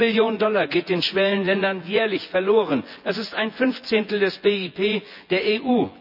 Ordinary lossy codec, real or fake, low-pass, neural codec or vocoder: none; fake; 5.4 kHz; vocoder, 24 kHz, 100 mel bands, Vocos